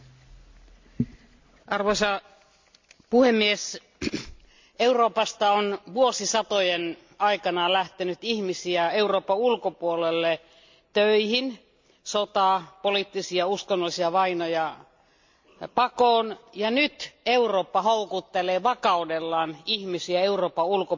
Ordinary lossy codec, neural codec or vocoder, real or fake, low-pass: MP3, 64 kbps; none; real; 7.2 kHz